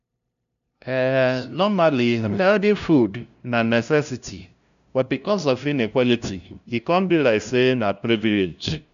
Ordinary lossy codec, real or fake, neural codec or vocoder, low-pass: none; fake; codec, 16 kHz, 0.5 kbps, FunCodec, trained on LibriTTS, 25 frames a second; 7.2 kHz